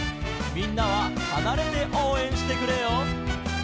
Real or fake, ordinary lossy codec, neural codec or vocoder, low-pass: real; none; none; none